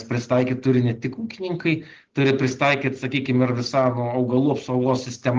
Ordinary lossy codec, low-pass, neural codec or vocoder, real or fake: Opus, 16 kbps; 7.2 kHz; none; real